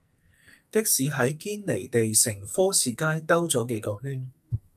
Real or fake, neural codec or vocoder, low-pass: fake; codec, 44.1 kHz, 2.6 kbps, SNAC; 14.4 kHz